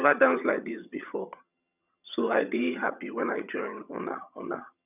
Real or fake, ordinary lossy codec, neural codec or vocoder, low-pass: fake; none; vocoder, 22.05 kHz, 80 mel bands, HiFi-GAN; 3.6 kHz